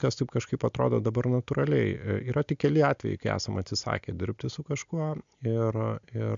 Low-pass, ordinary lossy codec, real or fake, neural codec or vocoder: 7.2 kHz; MP3, 64 kbps; real; none